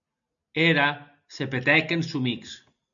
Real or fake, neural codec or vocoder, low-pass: real; none; 7.2 kHz